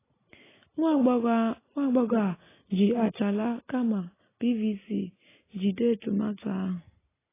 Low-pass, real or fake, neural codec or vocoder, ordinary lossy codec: 3.6 kHz; real; none; AAC, 16 kbps